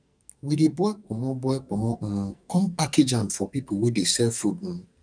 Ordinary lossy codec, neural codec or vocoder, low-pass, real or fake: none; codec, 44.1 kHz, 2.6 kbps, SNAC; 9.9 kHz; fake